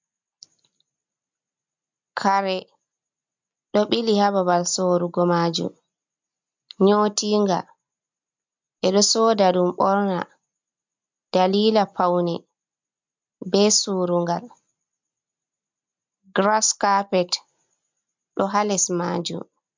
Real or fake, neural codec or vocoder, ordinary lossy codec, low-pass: real; none; MP3, 64 kbps; 7.2 kHz